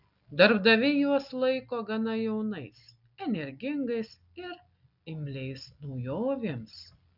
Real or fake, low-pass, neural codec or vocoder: real; 5.4 kHz; none